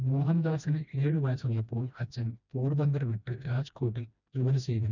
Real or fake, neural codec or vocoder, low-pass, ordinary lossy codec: fake; codec, 16 kHz, 1 kbps, FreqCodec, smaller model; 7.2 kHz; none